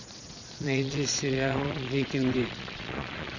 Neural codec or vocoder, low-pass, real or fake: vocoder, 22.05 kHz, 80 mel bands, Vocos; 7.2 kHz; fake